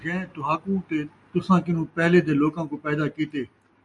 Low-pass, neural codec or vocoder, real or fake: 10.8 kHz; none; real